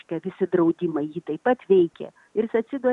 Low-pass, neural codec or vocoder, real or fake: 10.8 kHz; none; real